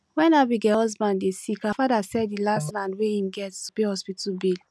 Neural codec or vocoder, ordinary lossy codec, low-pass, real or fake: none; none; none; real